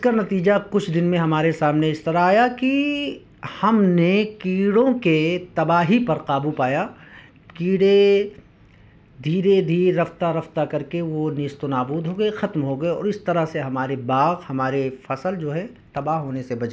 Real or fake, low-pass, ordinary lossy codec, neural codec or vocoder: real; none; none; none